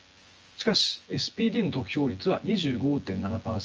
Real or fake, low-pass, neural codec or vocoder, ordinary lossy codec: fake; 7.2 kHz; vocoder, 24 kHz, 100 mel bands, Vocos; Opus, 24 kbps